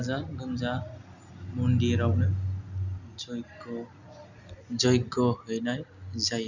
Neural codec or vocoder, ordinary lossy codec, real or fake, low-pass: none; none; real; 7.2 kHz